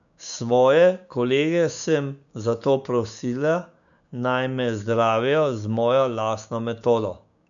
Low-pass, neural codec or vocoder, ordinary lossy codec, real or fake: 7.2 kHz; codec, 16 kHz, 6 kbps, DAC; none; fake